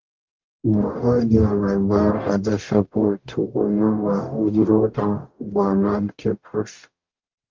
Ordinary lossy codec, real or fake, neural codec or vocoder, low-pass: Opus, 32 kbps; fake; codec, 44.1 kHz, 0.9 kbps, DAC; 7.2 kHz